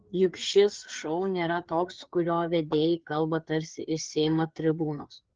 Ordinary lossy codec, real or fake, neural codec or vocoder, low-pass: Opus, 16 kbps; fake; codec, 16 kHz, 4 kbps, FreqCodec, larger model; 7.2 kHz